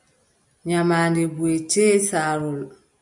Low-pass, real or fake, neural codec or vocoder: 10.8 kHz; real; none